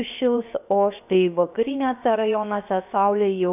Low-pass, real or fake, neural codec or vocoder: 3.6 kHz; fake; codec, 16 kHz, 0.7 kbps, FocalCodec